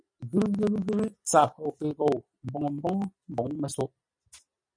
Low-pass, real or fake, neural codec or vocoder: 9.9 kHz; real; none